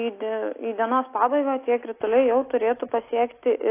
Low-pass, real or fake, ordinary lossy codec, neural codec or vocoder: 3.6 kHz; real; AAC, 24 kbps; none